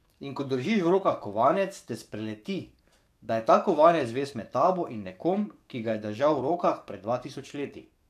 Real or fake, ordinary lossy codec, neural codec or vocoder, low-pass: fake; none; codec, 44.1 kHz, 7.8 kbps, DAC; 14.4 kHz